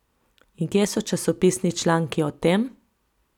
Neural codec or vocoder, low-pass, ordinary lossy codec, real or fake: none; 19.8 kHz; none; real